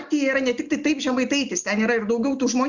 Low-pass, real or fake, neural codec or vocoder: 7.2 kHz; real; none